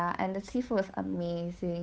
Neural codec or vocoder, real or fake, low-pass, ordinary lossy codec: codec, 16 kHz, 8 kbps, FunCodec, trained on Chinese and English, 25 frames a second; fake; none; none